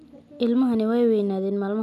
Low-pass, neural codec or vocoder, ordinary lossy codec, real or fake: 14.4 kHz; none; none; real